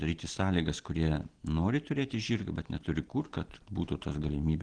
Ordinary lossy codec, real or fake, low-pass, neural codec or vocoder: Opus, 16 kbps; real; 9.9 kHz; none